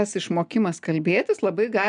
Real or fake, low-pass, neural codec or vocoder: real; 9.9 kHz; none